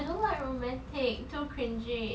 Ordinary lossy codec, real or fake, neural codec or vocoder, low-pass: none; real; none; none